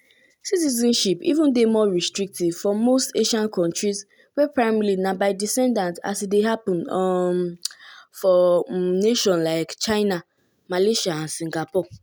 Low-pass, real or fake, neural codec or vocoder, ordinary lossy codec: none; real; none; none